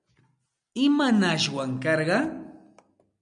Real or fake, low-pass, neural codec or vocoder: real; 9.9 kHz; none